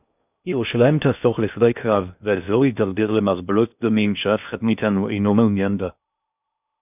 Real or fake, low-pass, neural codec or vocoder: fake; 3.6 kHz; codec, 16 kHz in and 24 kHz out, 0.6 kbps, FocalCodec, streaming, 2048 codes